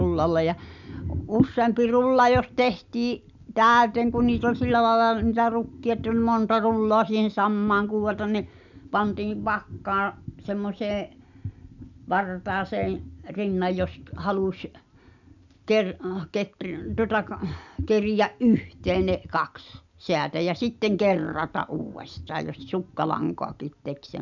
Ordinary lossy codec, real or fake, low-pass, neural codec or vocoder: none; real; 7.2 kHz; none